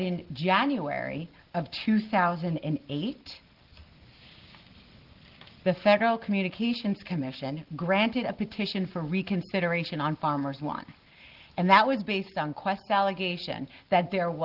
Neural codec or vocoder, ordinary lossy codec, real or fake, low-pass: none; Opus, 16 kbps; real; 5.4 kHz